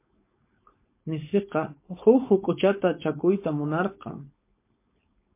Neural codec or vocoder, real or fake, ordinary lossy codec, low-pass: codec, 16 kHz, 4.8 kbps, FACodec; fake; MP3, 24 kbps; 3.6 kHz